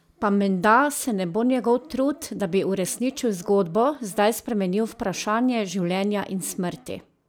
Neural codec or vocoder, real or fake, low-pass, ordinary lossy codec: none; real; none; none